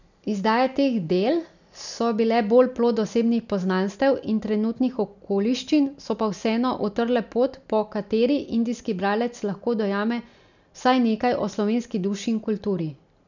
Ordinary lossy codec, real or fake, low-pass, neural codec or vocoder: none; real; 7.2 kHz; none